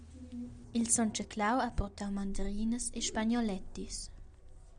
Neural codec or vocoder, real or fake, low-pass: none; real; 9.9 kHz